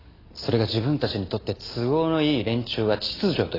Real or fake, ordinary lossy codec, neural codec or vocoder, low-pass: real; AAC, 24 kbps; none; 5.4 kHz